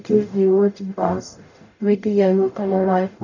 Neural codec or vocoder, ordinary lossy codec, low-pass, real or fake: codec, 44.1 kHz, 0.9 kbps, DAC; none; 7.2 kHz; fake